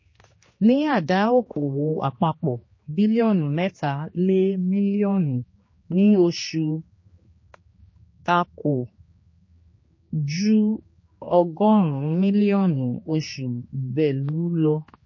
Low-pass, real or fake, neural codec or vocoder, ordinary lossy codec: 7.2 kHz; fake; codec, 16 kHz, 2 kbps, X-Codec, HuBERT features, trained on general audio; MP3, 32 kbps